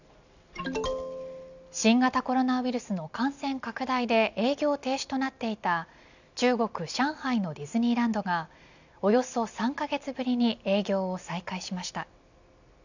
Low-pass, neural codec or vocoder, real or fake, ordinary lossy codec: 7.2 kHz; none; real; none